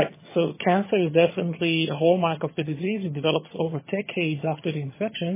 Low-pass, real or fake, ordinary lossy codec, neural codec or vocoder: 3.6 kHz; fake; MP3, 16 kbps; vocoder, 22.05 kHz, 80 mel bands, HiFi-GAN